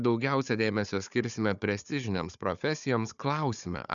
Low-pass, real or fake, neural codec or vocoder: 7.2 kHz; fake; codec, 16 kHz, 4 kbps, FunCodec, trained on Chinese and English, 50 frames a second